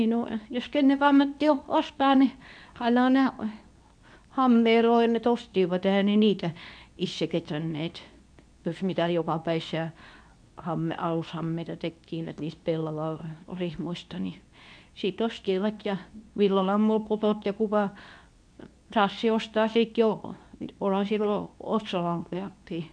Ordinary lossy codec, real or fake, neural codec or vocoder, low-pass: none; fake; codec, 24 kHz, 0.9 kbps, WavTokenizer, medium speech release version 2; 9.9 kHz